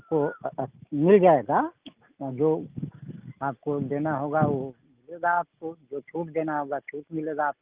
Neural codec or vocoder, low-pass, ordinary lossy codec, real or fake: none; 3.6 kHz; Opus, 32 kbps; real